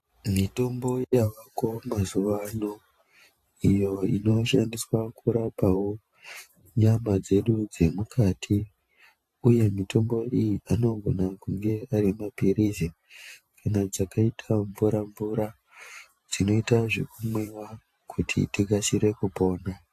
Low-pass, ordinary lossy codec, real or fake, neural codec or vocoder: 14.4 kHz; AAC, 64 kbps; fake; vocoder, 44.1 kHz, 128 mel bands every 512 samples, BigVGAN v2